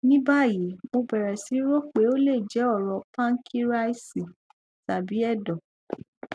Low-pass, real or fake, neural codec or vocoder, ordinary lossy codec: none; real; none; none